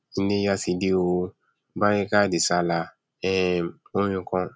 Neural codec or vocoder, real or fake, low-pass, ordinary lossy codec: none; real; none; none